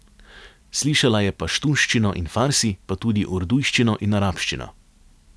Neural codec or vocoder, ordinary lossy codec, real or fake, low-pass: none; none; real; none